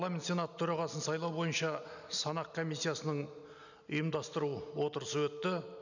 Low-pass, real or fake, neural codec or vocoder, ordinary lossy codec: 7.2 kHz; real; none; none